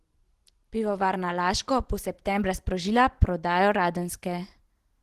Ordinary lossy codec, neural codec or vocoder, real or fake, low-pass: Opus, 16 kbps; none; real; 14.4 kHz